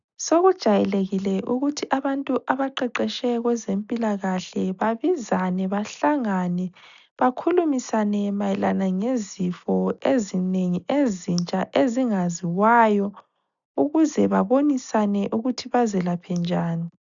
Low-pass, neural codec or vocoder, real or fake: 7.2 kHz; none; real